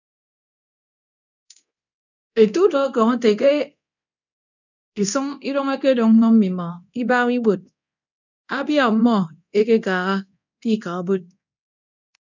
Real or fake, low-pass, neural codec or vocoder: fake; 7.2 kHz; codec, 24 kHz, 0.9 kbps, DualCodec